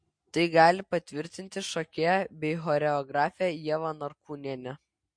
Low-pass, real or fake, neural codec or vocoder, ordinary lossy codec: 9.9 kHz; real; none; MP3, 48 kbps